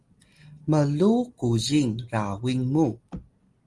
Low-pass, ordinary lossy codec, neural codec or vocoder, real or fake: 10.8 kHz; Opus, 24 kbps; none; real